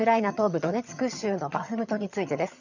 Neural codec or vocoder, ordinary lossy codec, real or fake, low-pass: vocoder, 22.05 kHz, 80 mel bands, HiFi-GAN; none; fake; 7.2 kHz